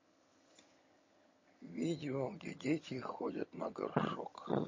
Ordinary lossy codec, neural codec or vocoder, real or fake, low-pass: MP3, 32 kbps; vocoder, 22.05 kHz, 80 mel bands, HiFi-GAN; fake; 7.2 kHz